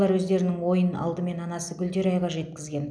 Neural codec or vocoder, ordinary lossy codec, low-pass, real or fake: none; none; none; real